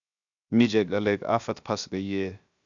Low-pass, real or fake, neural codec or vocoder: 7.2 kHz; fake; codec, 16 kHz, 0.7 kbps, FocalCodec